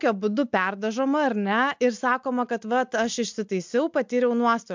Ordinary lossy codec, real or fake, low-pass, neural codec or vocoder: MP3, 64 kbps; real; 7.2 kHz; none